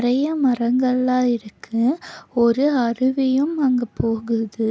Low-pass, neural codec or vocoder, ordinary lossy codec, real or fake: none; none; none; real